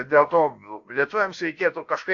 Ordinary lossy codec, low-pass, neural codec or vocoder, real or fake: AAC, 48 kbps; 7.2 kHz; codec, 16 kHz, about 1 kbps, DyCAST, with the encoder's durations; fake